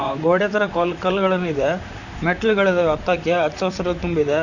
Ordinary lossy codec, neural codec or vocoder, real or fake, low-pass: none; vocoder, 44.1 kHz, 128 mel bands, Pupu-Vocoder; fake; 7.2 kHz